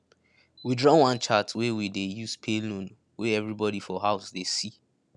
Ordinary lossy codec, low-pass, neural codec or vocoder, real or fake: none; none; none; real